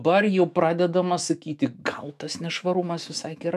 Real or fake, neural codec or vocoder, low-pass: fake; autoencoder, 48 kHz, 128 numbers a frame, DAC-VAE, trained on Japanese speech; 14.4 kHz